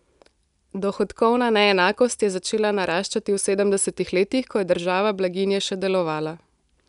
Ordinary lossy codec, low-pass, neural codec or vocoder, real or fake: none; 10.8 kHz; none; real